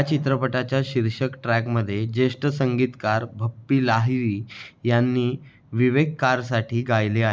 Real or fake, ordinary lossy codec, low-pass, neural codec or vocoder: real; none; none; none